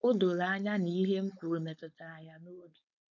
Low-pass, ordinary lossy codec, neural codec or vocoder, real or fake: 7.2 kHz; none; codec, 16 kHz, 4.8 kbps, FACodec; fake